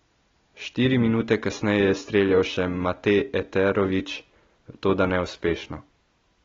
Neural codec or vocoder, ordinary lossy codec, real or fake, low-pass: none; AAC, 24 kbps; real; 7.2 kHz